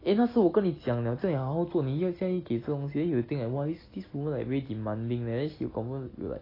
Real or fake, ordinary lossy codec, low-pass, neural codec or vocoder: real; AAC, 24 kbps; 5.4 kHz; none